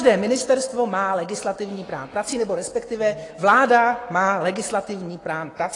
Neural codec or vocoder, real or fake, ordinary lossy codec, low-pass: autoencoder, 48 kHz, 128 numbers a frame, DAC-VAE, trained on Japanese speech; fake; AAC, 32 kbps; 10.8 kHz